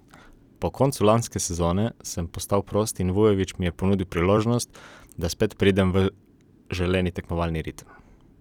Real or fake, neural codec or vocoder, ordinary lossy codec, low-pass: fake; vocoder, 44.1 kHz, 128 mel bands every 256 samples, BigVGAN v2; none; 19.8 kHz